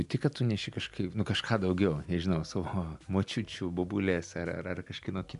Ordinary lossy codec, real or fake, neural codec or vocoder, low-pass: MP3, 96 kbps; real; none; 10.8 kHz